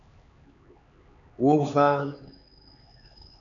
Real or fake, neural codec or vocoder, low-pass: fake; codec, 16 kHz, 4 kbps, X-Codec, HuBERT features, trained on LibriSpeech; 7.2 kHz